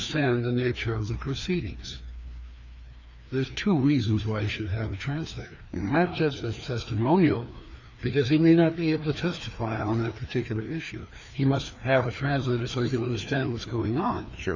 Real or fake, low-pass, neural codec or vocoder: fake; 7.2 kHz; codec, 16 kHz, 2 kbps, FreqCodec, larger model